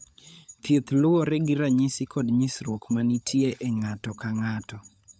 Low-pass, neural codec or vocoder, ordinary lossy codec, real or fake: none; codec, 16 kHz, 16 kbps, FunCodec, trained on LibriTTS, 50 frames a second; none; fake